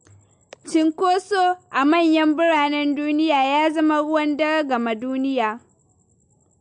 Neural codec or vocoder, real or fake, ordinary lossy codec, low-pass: none; real; MP3, 48 kbps; 9.9 kHz